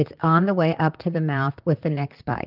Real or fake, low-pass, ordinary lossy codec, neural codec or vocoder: fake; 5.4 kHz; Opus, 24 kbps; vocoder, 44.1 kHz, 128 mel bands, Pupu-Vocoder